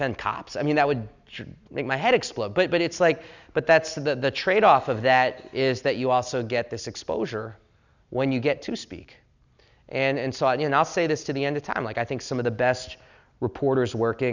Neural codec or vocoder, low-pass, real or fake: none; 7.2 kHz; real